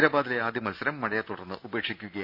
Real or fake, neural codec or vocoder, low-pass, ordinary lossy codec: real; none; 5.4 kHz; AAC, 48 kbps